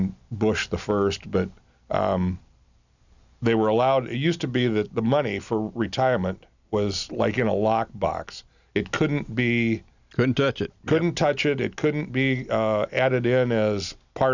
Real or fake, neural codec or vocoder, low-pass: real; none; 7.2 kHz